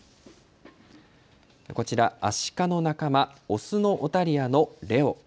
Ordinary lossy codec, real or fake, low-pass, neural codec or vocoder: none; real; none; none